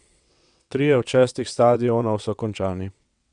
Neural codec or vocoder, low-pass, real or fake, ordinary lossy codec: vocoder, 22.05 kHz, 80 mel bands, WaveNeXt; 9.9 kHz; fake; none